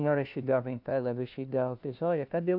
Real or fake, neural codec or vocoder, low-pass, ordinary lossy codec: fake; codec, 16 kHz, 1 kbps, FunCodec, trained on LibriTTS, 50 frames a second; 5.4 kHz; AAC, 48 kbps